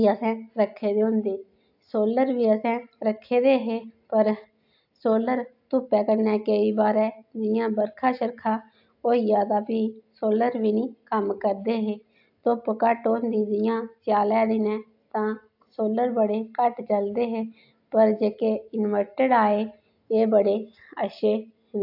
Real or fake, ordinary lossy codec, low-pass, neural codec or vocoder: real; AAC, 48 kbps; 5.4 kHz; none